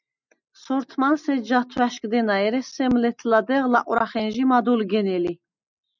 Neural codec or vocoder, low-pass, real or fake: none; 7.2 kHz; real